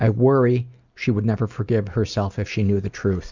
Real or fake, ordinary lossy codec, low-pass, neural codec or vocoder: real; Opus, 64 kbps; 7.2 kHz; none